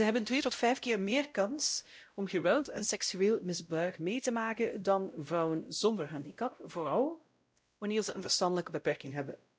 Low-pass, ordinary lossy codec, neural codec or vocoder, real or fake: none; none; codec, 16 kHz, 0.5 kbps, X-Codec, WavLM features, trained on Multilingual LibriSpeech; fake